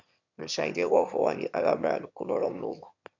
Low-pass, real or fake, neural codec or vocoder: 7.2 kHz; fake; autoencoder, 22.05 kHz, a latent of 192 numbers a frame, VITS, trained on one speaker